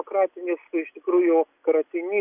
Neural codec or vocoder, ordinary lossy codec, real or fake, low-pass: none; Opus, 64 kbps; real; 3.6 kHz